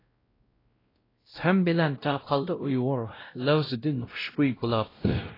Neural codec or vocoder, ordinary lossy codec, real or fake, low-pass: codec, 16 kHz, 0.5 kbps, X-Codec, WavLM features, trained on Multilingual LibriSpeech; AAC, 24 kbps; fake; 5.4 kHz